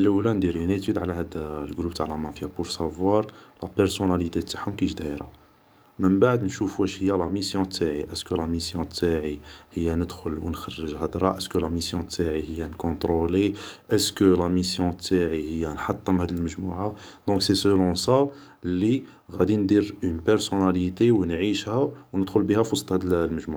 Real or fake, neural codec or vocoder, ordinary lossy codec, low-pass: fake; codec, 44.1 kHz, 7.8 kbps, DAC; none; none